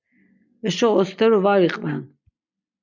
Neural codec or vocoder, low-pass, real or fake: none; 7.2 kHz; real